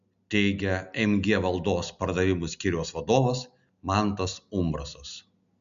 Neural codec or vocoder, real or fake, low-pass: none; real; 7.2 kHz